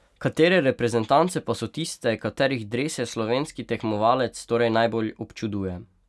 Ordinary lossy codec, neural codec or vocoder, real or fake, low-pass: none; none; real; none